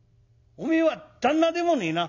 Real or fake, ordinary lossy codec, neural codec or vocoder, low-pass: real; none; none; 7.2 kHz